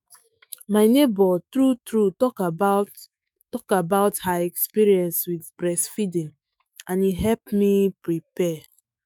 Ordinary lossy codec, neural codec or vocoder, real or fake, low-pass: none; autoencoder, 48 kHz, 128 numbers a frame, DAC-VAE, trained on Japanese speech; fake; none